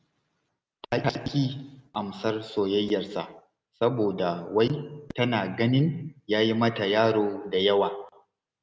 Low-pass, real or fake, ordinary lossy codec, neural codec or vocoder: none; real; none; none